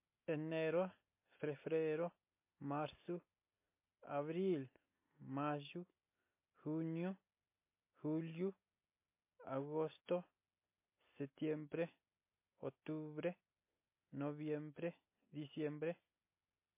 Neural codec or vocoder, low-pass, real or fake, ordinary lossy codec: none; 3.6 kHz; real; MP3, 24 kbps